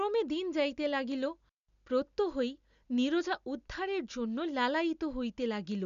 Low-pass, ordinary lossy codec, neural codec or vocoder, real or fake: 7.2 kHz; none; none; real